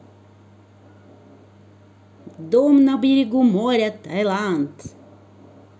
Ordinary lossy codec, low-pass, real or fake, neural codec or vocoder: none; none; real; none